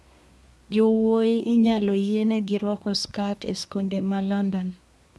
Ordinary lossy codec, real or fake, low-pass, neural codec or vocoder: none; fake; none; codec, 24 kHz, 1 kbps, SNAC